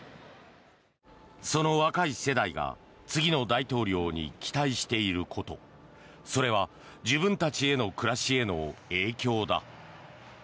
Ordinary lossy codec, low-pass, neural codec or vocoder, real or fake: none; none; none; real